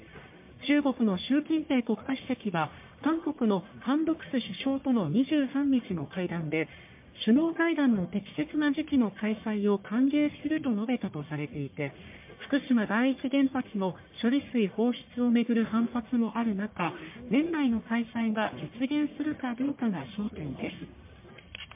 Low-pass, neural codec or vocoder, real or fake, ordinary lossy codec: 3.6 kHz; codec, 44.1 kHz, 1.7 kbps, Pupu-Codec; fake; MP3, 32 kbps